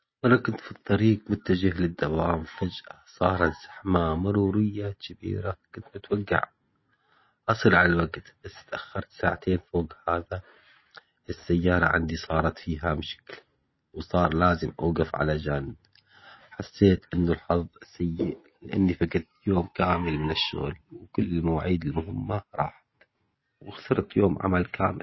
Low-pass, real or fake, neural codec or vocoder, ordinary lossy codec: 7.2 kHz; real; none; MP3, 24 kbps